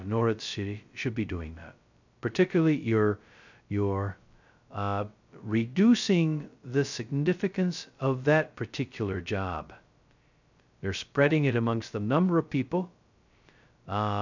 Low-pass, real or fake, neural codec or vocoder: 7.2 kHz; fake; codec, 16 kHz, 0.2 kbps, FocalCodec